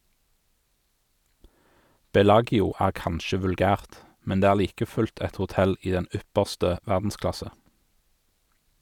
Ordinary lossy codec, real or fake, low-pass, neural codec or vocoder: none; real; 19.8 kHz; none